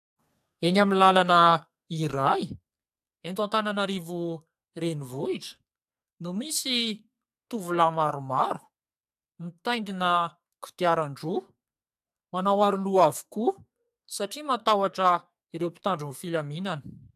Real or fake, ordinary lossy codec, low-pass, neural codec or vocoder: fake; AAC, 96 kbps; 14.4 kHz; codec, 44.1 kHz, 2.6 kbps, SNAC